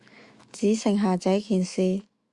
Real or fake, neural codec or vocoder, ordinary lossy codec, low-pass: fake; autoencoder, 48 kHz, 128 numbers a frame, DAC-VAE, trained on Japanese speech; Opus, 64 kbps; 10.8 kHz